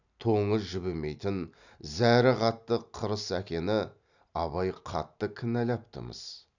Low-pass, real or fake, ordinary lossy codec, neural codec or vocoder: 7.2 kHz; real; none; none